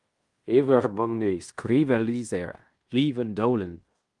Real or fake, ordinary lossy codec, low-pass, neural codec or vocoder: fake; Opus, 32 kbps; 10.8 kHz; codec, 16 kHz in and 24 kHz out, 0.9 kbps, LongCat-Audio-Codec, fine tuned four codebook decoder